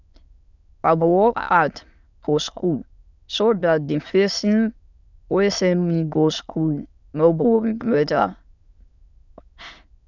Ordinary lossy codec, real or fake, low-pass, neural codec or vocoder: none; fake; 7.2 kHz; autoencoder, 22.05 kHz, a latent of 192 numbers a frame, VITS, trained on many speakers